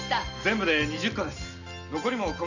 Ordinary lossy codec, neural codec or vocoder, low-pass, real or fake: none; none; 7.2 kHz; real